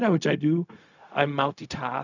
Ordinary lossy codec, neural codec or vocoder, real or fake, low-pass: none; codec, 16 kHz, 0.4 kbps, LongCat-Audio-Codec; fake; 7.2 kHz